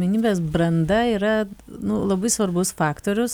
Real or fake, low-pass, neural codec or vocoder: real; 19.8 kHz; none